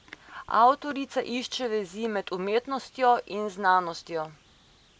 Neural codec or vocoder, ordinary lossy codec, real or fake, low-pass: none; none; real; none